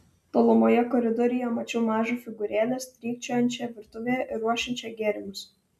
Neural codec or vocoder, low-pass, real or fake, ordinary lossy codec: none; 14.4 kHz; real; MP3, 96 kbps